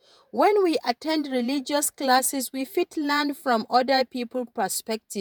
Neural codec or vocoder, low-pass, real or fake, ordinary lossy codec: vocoder, 48 kHz, 128 mel bands, Vocos; none; fake; none